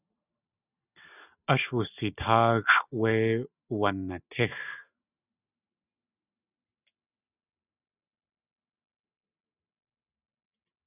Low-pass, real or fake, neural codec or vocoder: 3.6 kHz; real; none